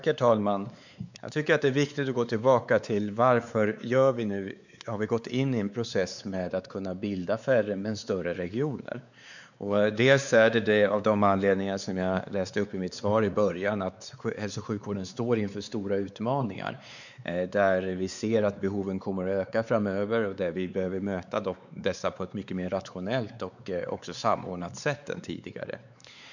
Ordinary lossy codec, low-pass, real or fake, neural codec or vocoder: none; 7.2 kHz; fake; codec, 16 kHz, 4 kbps, X-Codec, WavLM features, trained on Multilingual LibriSpeech